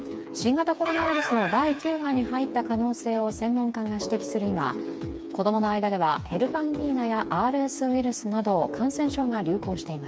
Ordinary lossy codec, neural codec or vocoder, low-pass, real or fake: none; codec, 16 kHz, 4 kbps, FreqCodec, smaller model; none; fake